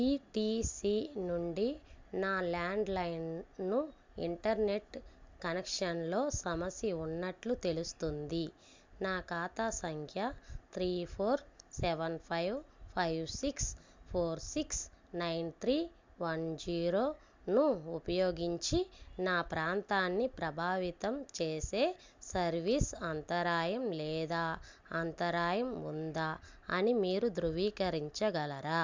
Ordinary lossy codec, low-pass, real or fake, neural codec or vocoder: MP3, 64 kbps; 7.2 kHz; real; none